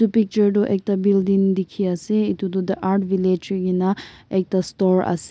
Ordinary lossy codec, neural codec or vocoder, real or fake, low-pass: none; none; real; none